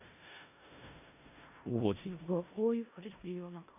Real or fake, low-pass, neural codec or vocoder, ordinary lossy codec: fake; 3.6 kHz; codec, 16 kHz in and 24 kHz out, 0.4 kbps, LongCat-Audio-Codec, four codebook decoder; none